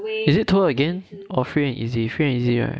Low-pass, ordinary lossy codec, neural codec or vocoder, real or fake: none; none; none; real